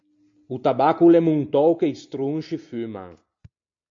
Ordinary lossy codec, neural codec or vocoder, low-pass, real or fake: AAC, 64 kbps; none; 7.2 kHz; real